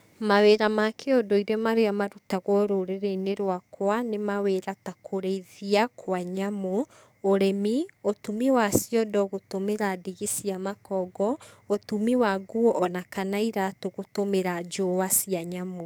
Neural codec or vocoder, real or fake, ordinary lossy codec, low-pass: codec, 44.1 kHz, 7.8 kbps, DAC; fake; none; none